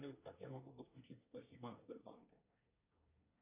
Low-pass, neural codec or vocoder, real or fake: 3.6 kHz; codec, 24 kHz, 1 kbps, SNAC; fake